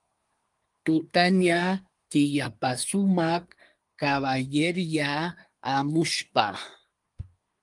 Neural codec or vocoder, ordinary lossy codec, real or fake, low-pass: codec, 24 kHz, 1 kbps, SNAC; Opus, 32 kbps; fake; 10.8 kHz